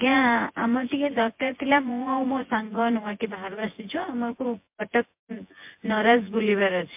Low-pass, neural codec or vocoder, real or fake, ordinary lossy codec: 3.6 kHz; vocoder, 24 kHz, 100 mel bands, Vocos; fake; MP3, 32 kbps